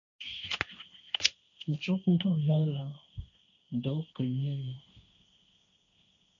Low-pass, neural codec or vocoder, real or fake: 7.2 kHz; codec, 16 kHz, 1.1 kbps, Voila-Tokenizer; fake